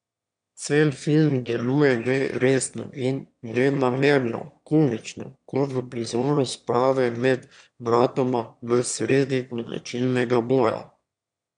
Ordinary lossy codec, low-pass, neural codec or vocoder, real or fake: none; 9.9 kHz; autoencoder, 22.05 kHz, a latent of 192 numbers a frame, VITS, trained on one speaker; fake